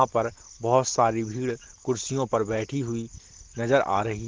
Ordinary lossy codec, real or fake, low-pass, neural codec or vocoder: Opus, 16 kbps; real; 7.2 kHz; none